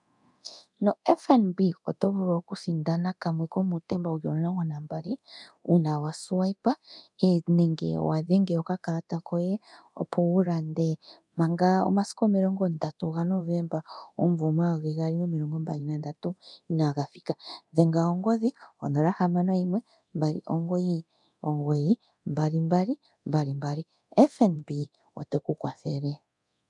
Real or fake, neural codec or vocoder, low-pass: fake; codec, 24 kHz, 0.9 kbps, DualCodec; 10.8 kHz